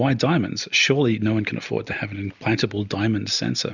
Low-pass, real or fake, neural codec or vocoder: 7.2 kHz; real; none